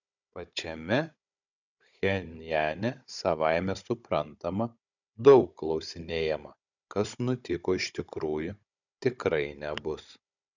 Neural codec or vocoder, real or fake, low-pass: codec, 16 kHz, 16 kbps, FunCodec, trained on Chinese and English, 50 frames a second; fake; 7.2 kHz